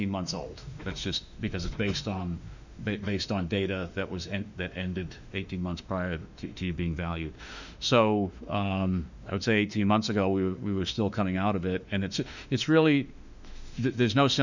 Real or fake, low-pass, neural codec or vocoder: fake; 7.2 kHz; autoencoder, 48 kHz, 32 numbers a frame, DAC-VAE, trained on Japanese speech